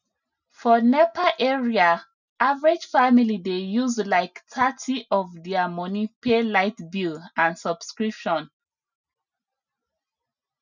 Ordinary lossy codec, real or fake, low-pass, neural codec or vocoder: none; real; 7.2 kHz; none